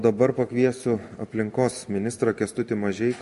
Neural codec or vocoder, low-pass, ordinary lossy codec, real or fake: none; 14.4 kHz; MP3, 48 kbps; real